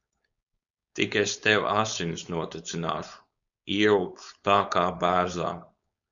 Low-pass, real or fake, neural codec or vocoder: 7.2 kHz; fake; codec, 16 kHz, 4.8 kbps, FACodec